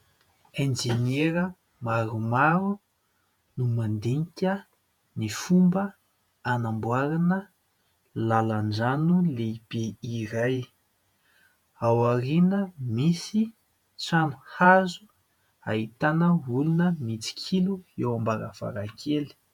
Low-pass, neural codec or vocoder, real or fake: 19.8 kHz; vocoder, 48 kHz, 128 mel bands, Vocos; fake